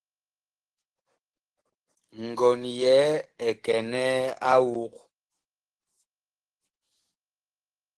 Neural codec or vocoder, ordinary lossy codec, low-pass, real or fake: codec, 44.1 kHz, 7.8 kbps, DAC; Opus, 16 kbps; 10.8 kHz; fake